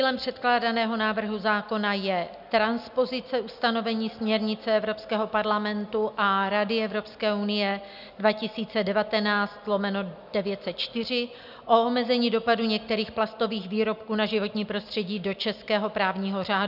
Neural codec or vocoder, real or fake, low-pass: none; real; 5.4 kHz